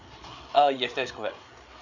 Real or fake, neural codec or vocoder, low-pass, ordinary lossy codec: fake; codec, 16 kHz, 8 kbps, FreqCodec, larger model; 7.2 kHz; none